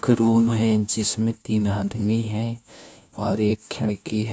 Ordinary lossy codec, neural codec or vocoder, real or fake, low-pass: none; codec, 16 kHz, 1 kbps, FunCodec, trained on LibriTTS, 50 frames a second; fake; none